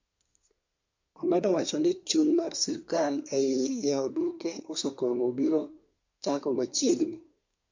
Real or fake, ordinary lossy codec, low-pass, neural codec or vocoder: fake; MP3, 48 kbps; 7.2 kHz; codec, 24 kHz, 1 kbps, SNAC